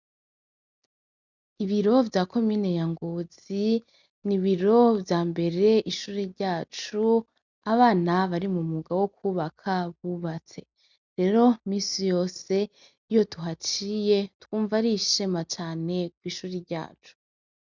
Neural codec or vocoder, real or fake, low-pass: none; real; 7.2 kHz